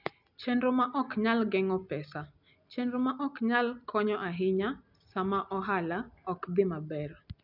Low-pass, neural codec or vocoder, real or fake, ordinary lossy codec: 5.4 kHz; none; real; none